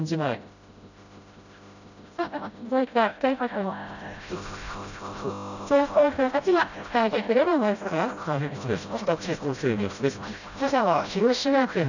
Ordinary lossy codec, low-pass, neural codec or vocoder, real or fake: none; 7.2 kHz; codec, 16 kHz, 0.5 kbps, FreqCodec, smaller model; fake